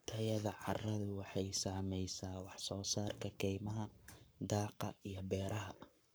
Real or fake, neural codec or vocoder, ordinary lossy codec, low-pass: fake; codec, 44.1 kHz, 7.8 kbps, Pupu-Codec; none; none